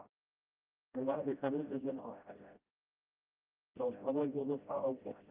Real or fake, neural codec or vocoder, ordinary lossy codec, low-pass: fake; codec, 16 kHz, 0.5 kbps, FreqCodec, smaller model; Opus, 32 kbps; 3.6 kHz